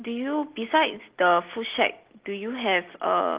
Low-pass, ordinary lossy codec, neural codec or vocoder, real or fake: 3.6 kHz; Opus, 16 kbps; none; real